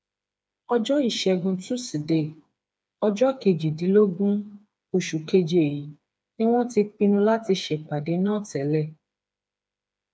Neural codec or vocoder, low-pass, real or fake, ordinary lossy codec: codec, 16 kHz, 4 kbps, FreqCodec, smaller model; none; fake; none